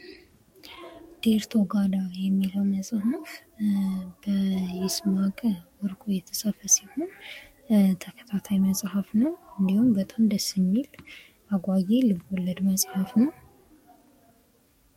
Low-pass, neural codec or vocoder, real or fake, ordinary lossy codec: 19.8 kHz; codec, 44.1 kHz, 7.8 kbps, DAC; fake; MP3, 64 kbps